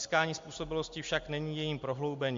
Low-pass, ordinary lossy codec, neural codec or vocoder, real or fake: 7.2 kHz; MP3, 48 kbps; none; real